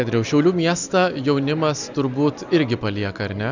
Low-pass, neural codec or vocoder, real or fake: 7.2 kHz; none; real